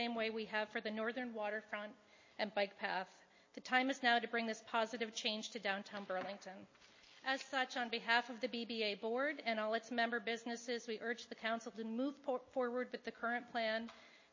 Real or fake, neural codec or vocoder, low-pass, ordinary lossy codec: real; none; 7.2 kHz; MP3, 32 kbps